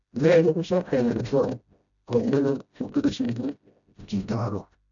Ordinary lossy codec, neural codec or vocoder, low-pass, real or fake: MP3, 96 kbps; codec, 16 kHz, 0.5 kbps, FreqCodec, smaller model; 7.2 kHz; fake